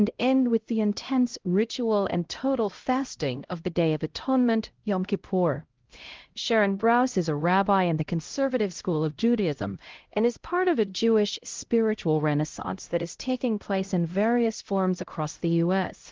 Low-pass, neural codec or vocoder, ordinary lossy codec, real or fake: 7.2 kHz; codec, 16 kHz, 0.5 kbps, X-Codec, HuBERT features, trained on LibriSpeech; Opus, 16 kbps; fake